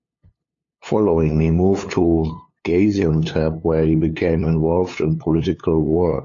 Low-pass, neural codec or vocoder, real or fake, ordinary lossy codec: 7.2 kHz; codec, 16 kHz, 2 kbps, FunCodec, trained on LibriTTS, 25 frames a second; fake; AAC, 48 kbps